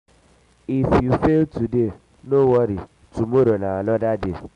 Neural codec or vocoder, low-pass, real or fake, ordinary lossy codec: none; 10.8 kHz; real; MP3, 96 kbps